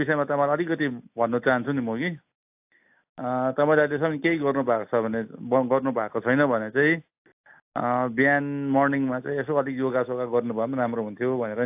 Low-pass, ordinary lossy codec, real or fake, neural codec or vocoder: 3.6 kHz; AAC, 32 kbps; real; none